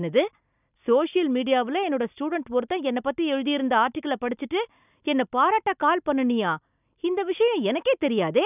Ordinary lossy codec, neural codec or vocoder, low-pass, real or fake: none; none; 3.6 kHz; real